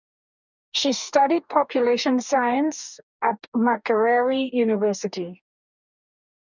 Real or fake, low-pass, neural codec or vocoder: fake; 7.2 kHz; codec, 44.1 kHz, 2.6 kbps, DAC